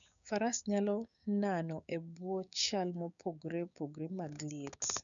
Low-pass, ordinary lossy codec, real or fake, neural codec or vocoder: 7.2 kHz; none; fake; codec, 16 kHz, 6 kbps, DAC